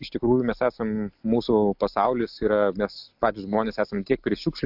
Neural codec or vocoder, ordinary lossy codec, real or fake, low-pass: none; AAC, 48 kbps; real; 5.4 kHz